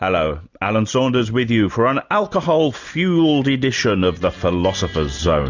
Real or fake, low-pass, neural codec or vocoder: real; 7.2 kHz; none